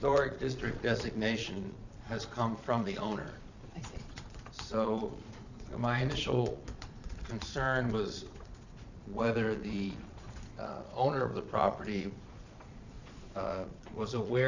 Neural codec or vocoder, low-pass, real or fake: vocoder, 22.05 kHz, 80 mel bands, Vocos; 7.2 kHz; fake